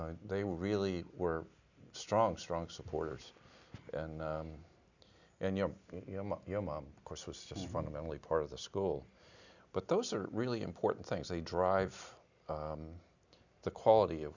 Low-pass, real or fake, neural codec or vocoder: 7.2 kHz; real; none